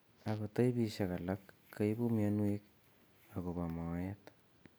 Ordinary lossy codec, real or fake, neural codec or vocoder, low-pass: none; real; none; none